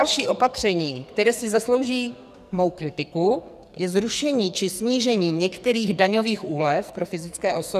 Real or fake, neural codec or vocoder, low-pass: fake; codec, 44.1 kHz, 2.6 kbps, SNAC; 14.4 kHz